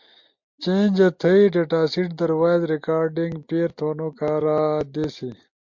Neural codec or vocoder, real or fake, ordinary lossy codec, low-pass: none; real; MP3, 48 kbps; 7.2 kHz